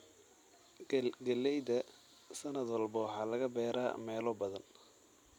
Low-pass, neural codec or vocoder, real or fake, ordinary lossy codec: 19.8 kHz; none; real; none